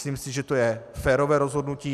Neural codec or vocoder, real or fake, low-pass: none; real; 14.4 kHz